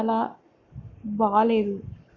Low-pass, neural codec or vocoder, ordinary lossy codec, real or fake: 7.2 kHz; codec, 44.1 kHz, 7.8 kbps, Pupu-Codec; Opus, 64 kbps; fake